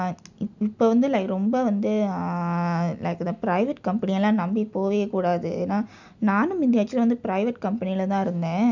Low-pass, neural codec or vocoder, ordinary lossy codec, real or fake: 7.2 kHz; none; none; real